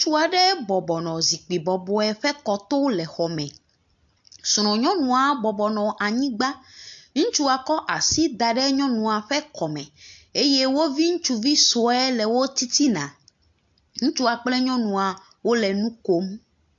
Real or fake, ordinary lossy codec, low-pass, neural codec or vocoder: real; AAC, 64 kbps; 7.2 kHz; none